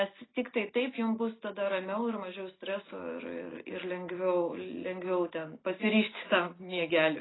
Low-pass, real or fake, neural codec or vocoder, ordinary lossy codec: 7.2 kHz; real; none; AAC, 16 kbps